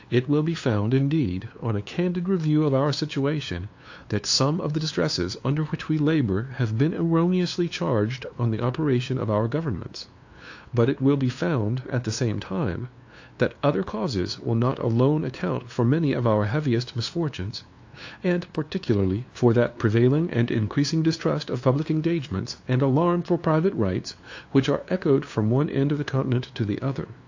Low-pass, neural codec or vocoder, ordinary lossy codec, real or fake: 7.2 kHz; codec, 16 kHz, 2 kbps, FunCodec, trained on LibriTTS, 25 frames a second; AAC, 48 kbps; fake